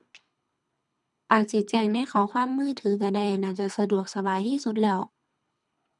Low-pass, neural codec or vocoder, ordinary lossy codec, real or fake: 10.8 kHz; codec, 24 kHz, 3 kbps, HILCodec; none; fake